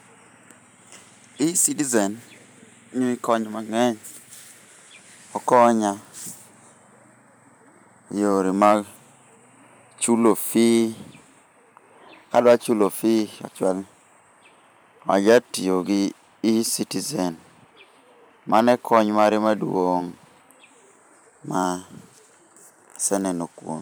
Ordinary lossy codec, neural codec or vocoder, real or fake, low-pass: none; vocoder, 44.1 kHz, 128 mel bands every 512 samples, BigVGAN v2; fake; none